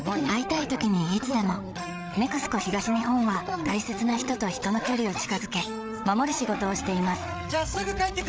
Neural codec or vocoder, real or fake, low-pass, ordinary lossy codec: codec, 16 kHz, 8 kbps, FreqCodec, larger model; fake; none; none